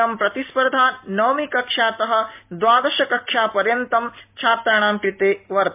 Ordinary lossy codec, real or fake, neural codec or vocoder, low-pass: MP3, 32 kbps; real; none; 3.6 kHz